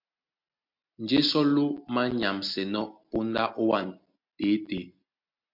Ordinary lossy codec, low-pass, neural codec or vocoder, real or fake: MP3, 48 kbps; 5.4 kHz; none; real